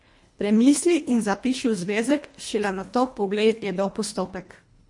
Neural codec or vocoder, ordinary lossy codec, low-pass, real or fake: codec, 24 kHz, 1.5 kbps, HILCodec; MP3, 48 kbps; 10.8 kHz; fake